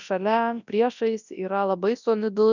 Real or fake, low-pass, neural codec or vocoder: fake; 7.2 kHz; codec, 24 kHz, 0.9 kbps, WavTokenizer, large speech release